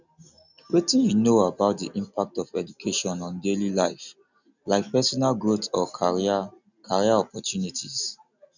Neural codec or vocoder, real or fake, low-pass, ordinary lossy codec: none; real; 7.2 kHz; none